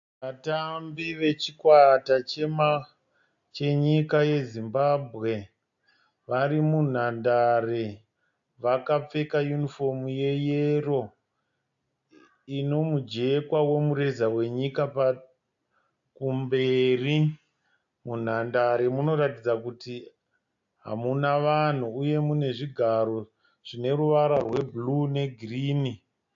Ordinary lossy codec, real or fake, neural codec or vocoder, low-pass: AAC, 64 kbps; real; none; 7.2 kHz